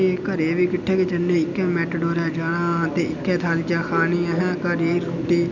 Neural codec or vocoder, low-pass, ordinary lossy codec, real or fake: none; 7.2 kHz; none; real